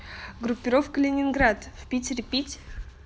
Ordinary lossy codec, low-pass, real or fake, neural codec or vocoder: none; none; real; none